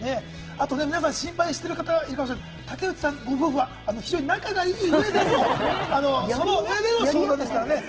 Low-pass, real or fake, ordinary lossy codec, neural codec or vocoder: 7.2 kHz; real; Opus, 16 kbps; none